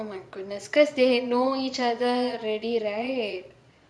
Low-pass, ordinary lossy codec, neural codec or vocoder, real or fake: none; none; vocoder, 22.05 kHz, 80 mel bands, Vocos; fake